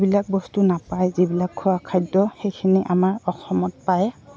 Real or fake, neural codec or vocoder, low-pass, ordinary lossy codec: real; none; none; none